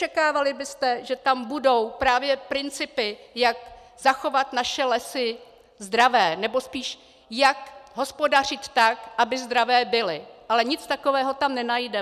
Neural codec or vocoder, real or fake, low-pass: none; real; 14.4 kHz